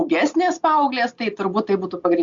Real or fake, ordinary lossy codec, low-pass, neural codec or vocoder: real; Opus, 64 kbps; 7.2 kHz; none